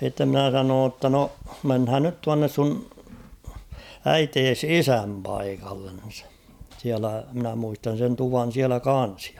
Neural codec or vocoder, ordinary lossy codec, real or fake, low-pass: vocoder, 44.1 kHz, 128 mel bands every 512 samples, BigVGAN v2; none; fake; 19.8 kHz